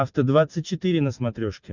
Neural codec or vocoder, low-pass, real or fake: none; 7.2 kHz; real